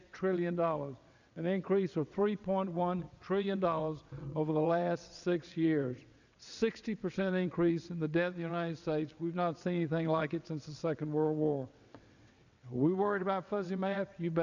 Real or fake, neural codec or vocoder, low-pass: fake; vocoder, 22.05 kHz, 80 mel bands, WaveNeXt; 7.2 kHz